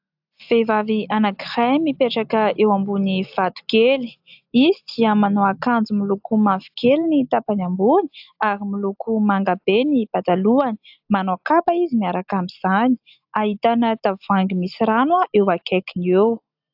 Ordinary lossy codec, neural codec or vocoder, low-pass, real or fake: AAC, 48 kbps; none; 5.4 kHz; real